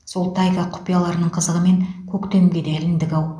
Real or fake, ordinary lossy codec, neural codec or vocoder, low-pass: real; none; none; none